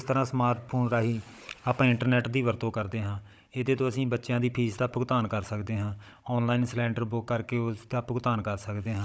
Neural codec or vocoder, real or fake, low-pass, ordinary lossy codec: codec, 16 kHz, 16 kbps, FunCodec, trained on Chinese and English, 50 frames a second; fake; none; none